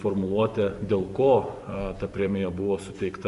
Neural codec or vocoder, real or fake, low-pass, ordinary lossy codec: vocoder, 24 kHz, 100 mel bands, Vocos; fake; 10.8 kHz; AAC, 64 kbps